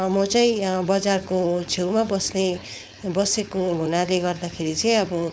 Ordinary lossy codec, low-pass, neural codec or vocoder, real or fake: none; none; codec, 16 kHz, 4.8 kbps, FACodec; fake